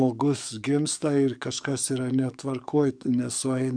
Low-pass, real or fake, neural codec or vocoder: 9.9 kHz; fake; codec, 44.1 kHz, 7.8 kbps, DAC